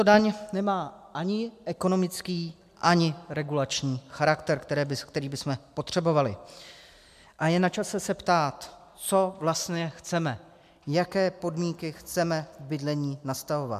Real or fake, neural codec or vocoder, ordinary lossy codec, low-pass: real; none; MP3, 96 kbps; 14.4 kHz